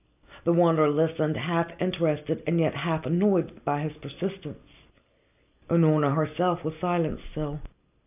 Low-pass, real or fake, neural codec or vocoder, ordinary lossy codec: 3.6 kHz; real; none; AAC, 32 kbps